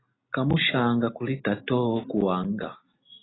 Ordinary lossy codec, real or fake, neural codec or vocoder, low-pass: AAC, 16 kbps; real; none; 7.2 kHz